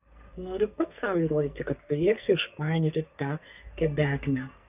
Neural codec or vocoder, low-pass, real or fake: codec, 44.1 kHz, 2.6 kbps, SNAC; 3.6 kHz; fake